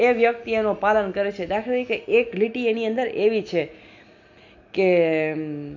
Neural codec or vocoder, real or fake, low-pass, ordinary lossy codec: none; real; 7.2 kHz; none